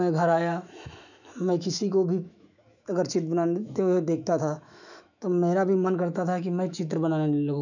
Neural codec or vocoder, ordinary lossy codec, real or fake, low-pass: autoencoder, 48 kHz, 128 numbers a frame, DAC-VAE, trained on Japanese speech; none; fake; 7.2 kHz